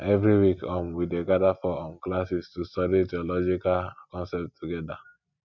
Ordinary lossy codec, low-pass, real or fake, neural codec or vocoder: none; 7.2 kHz; real; none